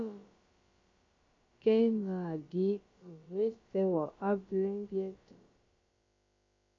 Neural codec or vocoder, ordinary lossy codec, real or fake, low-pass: codec, 16 kHz, about 1 kbps, DyCAST, with the encoder's durations; MP3, 48 kbps; fake; 7.2 kHz